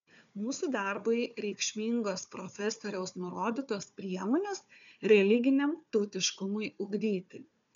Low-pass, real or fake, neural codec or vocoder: 7.2 kHz; fake; codec, 16 kHz, 4 kbps, FunCodec, trained on Chinese and English, 50 frames a second